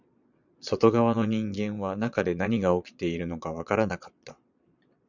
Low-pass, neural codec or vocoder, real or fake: 7.2 kHz; vocoder, 22.05 kHz, 80 mel bands, Vocos; fake